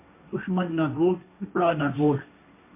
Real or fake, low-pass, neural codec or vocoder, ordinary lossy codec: fake; 3.6 kHz; codec, 16 kHz, 1.1 kbps, Voila-Tokenizer; MP3, 24 kbps